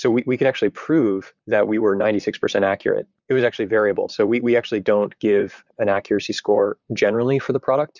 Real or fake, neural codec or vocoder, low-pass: fake; vocoder, 44.1 kHz, 128 mel bands, Pupu-Vocoder; 7.2 kHz